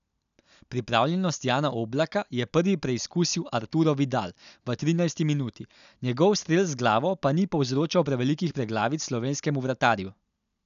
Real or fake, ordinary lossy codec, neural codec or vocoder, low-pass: real; none; none; 7.2 kHz